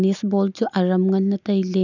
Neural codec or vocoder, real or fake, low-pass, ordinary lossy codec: none; real; 7.2 kHz; none